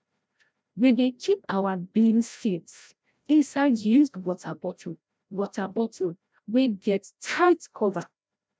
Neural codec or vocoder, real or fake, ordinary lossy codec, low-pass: codec, 16 kHz, 0.5 kbps, FreqCodec, larger model; fake; none; none